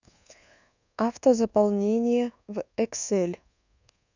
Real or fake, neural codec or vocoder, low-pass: fake; codec, 24 kHz, 1.2 kbps, DualCodec; 7.2 kHz